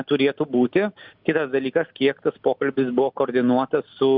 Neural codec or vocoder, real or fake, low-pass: none; real; 5.4 kHz